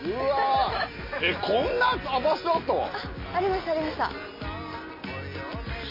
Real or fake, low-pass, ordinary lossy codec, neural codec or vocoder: real; 5.4 kHz; MP3, 24 kbps; none